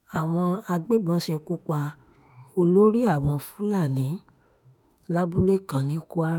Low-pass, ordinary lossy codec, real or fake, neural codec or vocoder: 19.8 kHz; none; fake; autoencoder, 48 kHz, 32 numbers a frame, DAC-VAE, trained on Japanese speech